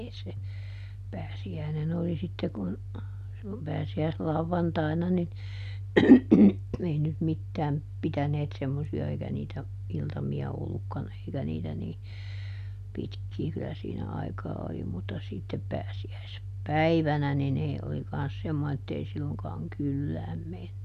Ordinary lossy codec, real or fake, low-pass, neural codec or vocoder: none; real; 14.4 kHz; none